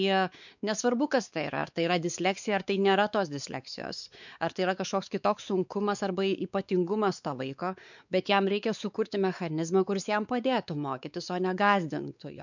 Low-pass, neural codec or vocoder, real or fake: 7.2 kHz; codec, 16 kHz, 4 kbps, X-Codec, WavLM features, trained on Multilingual LibriSpeech; fake